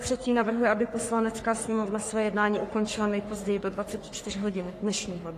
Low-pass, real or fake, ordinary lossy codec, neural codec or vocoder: 14.4 kHz; fake; AAC, 48 kbps; codec, 44.1 kHz, 3.4 kbps, Pupu-Codec